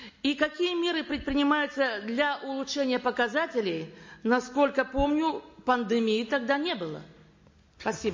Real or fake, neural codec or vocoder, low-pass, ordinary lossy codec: real; none; 7.2 kHz; MP3, 32 kbps